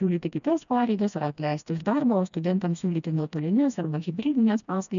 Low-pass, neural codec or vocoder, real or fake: 7.2 kHz; codec, 16 kHz, 1 kbps, FreqCodec, smaller model; fake